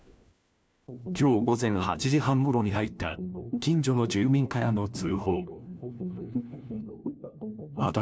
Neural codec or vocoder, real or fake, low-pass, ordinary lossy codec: codec, 16 kHz, 1 kbps, FunCodec, trained on LibriTTS, 50 frames a second; fake; none; none